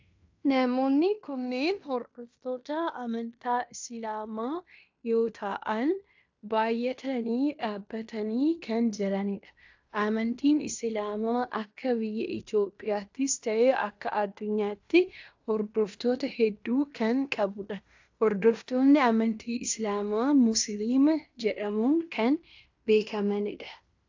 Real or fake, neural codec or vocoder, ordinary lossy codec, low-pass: fake; codec, 16 kHz in and 24 kHz out, 0.9 kbps, LongCat-Audio-Codec, fine tuned four codebook decoder; AAC, 48 kbps; 7.2 kHz